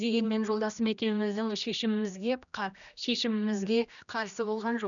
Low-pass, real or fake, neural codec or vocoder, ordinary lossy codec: 7.2 kHz; fake; codec, 16 kHz, 1 kbps, X-Codec, HuBERT features, trained on general audio; none